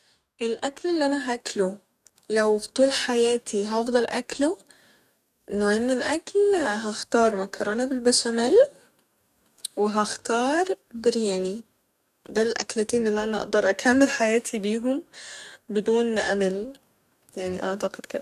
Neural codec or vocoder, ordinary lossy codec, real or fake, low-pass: codec, 44.1 kHz, 2.6 kbps, DAC; none; fake; 14.4 kHz